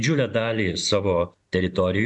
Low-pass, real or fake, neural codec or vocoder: 10.8 kHz; fake; vocoder, 44.1 kHz, 128 mel bands every 512 samples, BigVGAN v2